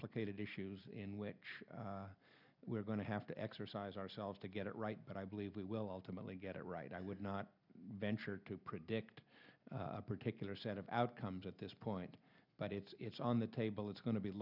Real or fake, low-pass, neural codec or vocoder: real; 5.4 kHz; none